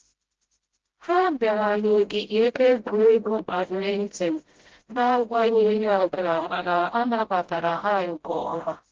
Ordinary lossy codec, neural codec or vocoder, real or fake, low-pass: Opus, 16 kbps; codec, 16 kHz, 0.5 kbps, FreqCodec, smaller model; fake; 7.2 kHz